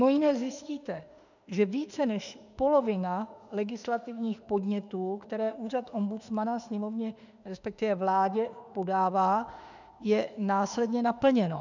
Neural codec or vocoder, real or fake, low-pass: autoencoder, 48 kHz, 32 numbers a frame, DAC-VAE, trained on Japanese speech; fake; 7.2 kHz